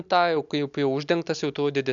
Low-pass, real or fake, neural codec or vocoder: 7.2 kHz; real; none